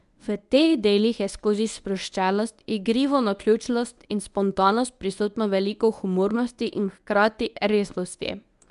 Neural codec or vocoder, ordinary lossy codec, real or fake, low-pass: codec, 24 kHz, 0.9 kbps, WavTokenizer, medium speech release version 1; none; fake; 10.8 kHz